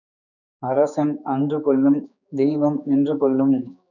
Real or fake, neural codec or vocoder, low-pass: fake; codec, 16 kHz, 4 kbps, X-Codec, HuBERT features, trained on general audio; 7.2 kHz